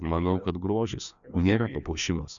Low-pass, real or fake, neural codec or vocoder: 7.2 kHz; fake; codec, 16 kHz, 2 kbps, FreqCodec, larger model